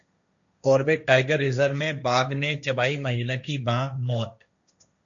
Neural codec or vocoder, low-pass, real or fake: codec, 16 kHz, 1.1 kbps, Voila-Tokenizer; 7.2 kHz; fake